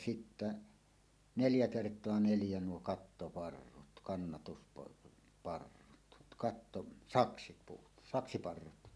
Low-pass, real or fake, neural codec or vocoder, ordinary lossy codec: none; real; none; none